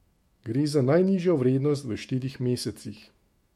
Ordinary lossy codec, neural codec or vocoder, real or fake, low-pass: MP3, 64 kbps; autoencoder, 48 kHz, 128 numbers a frame, DAC-VAE, trained on Japanese speech; fake; 19.8 kHz